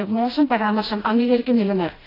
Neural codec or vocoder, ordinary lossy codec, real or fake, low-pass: codec, 16 kHz, 1 kbps, FreqCodec, smaller model; AAC, 24 kbps; fake; 5.4 kHz